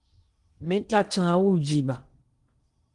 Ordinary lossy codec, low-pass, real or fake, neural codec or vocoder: Opus, 24 kbps; 10.8 kHz; fake; codec, 16 kHz in and 24 kHz out, 0.8 kbps, FocalCodec, streaming, 65536 codes